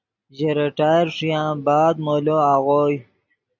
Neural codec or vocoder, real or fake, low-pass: none; real; 7.2 kHz